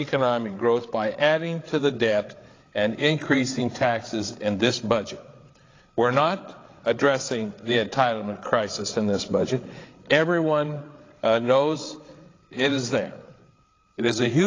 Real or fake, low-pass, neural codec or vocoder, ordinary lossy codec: fake; 7.2 kHz; codec, 16 kHz, 8 kbps, FreqCodec, larger model; AAC, 32 kbps